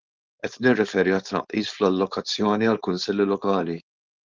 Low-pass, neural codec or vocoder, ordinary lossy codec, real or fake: 7.2 kHz; codec, 16 kHz, 4.8 kbps, FACodec; Opus, 32 kbps; fake